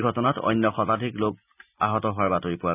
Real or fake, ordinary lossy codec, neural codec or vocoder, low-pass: real; none; none; 3.6 kHz